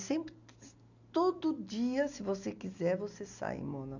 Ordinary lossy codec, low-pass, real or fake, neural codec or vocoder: none; 7.2 kHz; real; none